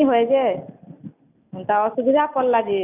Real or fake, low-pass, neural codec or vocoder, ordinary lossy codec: real; 3.6 kHz; none; none